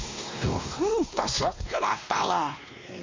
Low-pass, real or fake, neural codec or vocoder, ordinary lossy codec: 7.2 kHz; fake; codec, 16 kHz, 1 kbps, X-Codec, WavLM features, trained on Multilingual LibriSpeech; MP3, 48 kbps